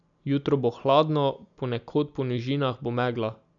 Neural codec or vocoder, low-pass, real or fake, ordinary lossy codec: none; 7.2 kHz; real; none